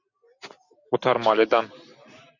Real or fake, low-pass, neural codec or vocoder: real; 7.2 kHz; none